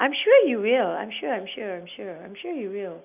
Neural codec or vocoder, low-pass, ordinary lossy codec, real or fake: none; 3.6 kHz; none; real